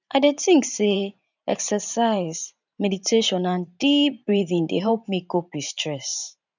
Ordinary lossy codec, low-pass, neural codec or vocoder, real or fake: none; 7.2 kHz; vocoder, 44.1 kHz, 128 mel bands every 512 samples, BigVGAN v2; fake